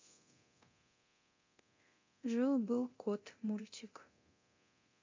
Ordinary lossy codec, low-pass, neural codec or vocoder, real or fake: MP3, 64 kbps; 7.2 kHz; codec, 24 kHz, 0.9 kbps, DualCodec; fake